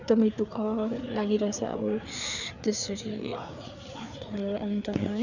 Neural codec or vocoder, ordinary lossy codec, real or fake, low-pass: codec, 16 kHz, 4 kbps, FreqCodec, larger model; none; fake; 7.2 kHz